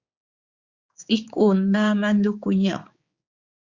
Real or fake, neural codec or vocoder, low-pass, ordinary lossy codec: fake; codec, 16 kHz, 4 kbps, X-Codec, HuBERT features, trained on general audio; 7.2 kHz; Opus, 64 kbps